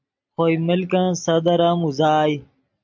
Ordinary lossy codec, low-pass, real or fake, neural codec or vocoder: MP3, 64 kbps; 7.2 kHz; real; none